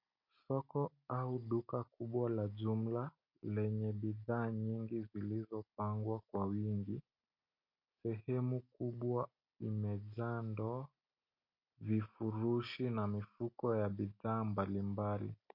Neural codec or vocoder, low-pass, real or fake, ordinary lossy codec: none; 5.4 kHz; real; MP3, 32 kbps